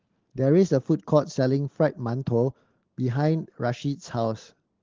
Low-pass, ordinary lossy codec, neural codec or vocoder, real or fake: 7.2 kHz; Opus, 16 kbps; none; real